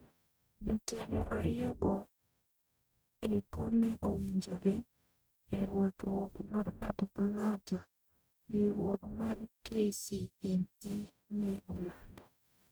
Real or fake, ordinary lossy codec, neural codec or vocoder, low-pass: fake; none; codec, 44.1 kHz, 0.9 kbps, DAC; none